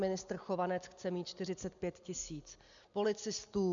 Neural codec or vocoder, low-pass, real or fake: none; 7.2 kHz; real